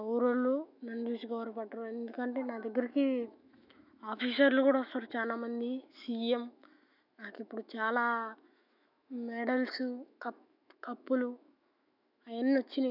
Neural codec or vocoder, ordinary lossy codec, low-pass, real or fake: autoencoder, 48 kHz, 128 numbers a frame, DAC-VAE, trained on Japanese speech; none; 5.4 kHz; fake